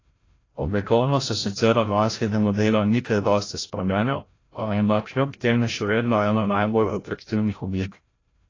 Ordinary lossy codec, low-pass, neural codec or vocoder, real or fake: AAC, 32 kbps; 7.2 kHz; codec, 16 kHz, 0.5 kbps, FreqCodec, larger model; fake